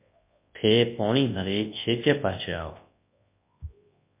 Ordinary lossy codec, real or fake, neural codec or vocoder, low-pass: MP3, 24 kbps; fake; codec, 24 kHz, 0.9 kbps, WavTokenizer, large speech release; 3.6 kHz